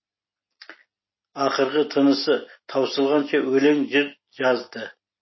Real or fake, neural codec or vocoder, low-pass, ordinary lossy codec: real; none; 7.2 kHz; MP3, 24 kbps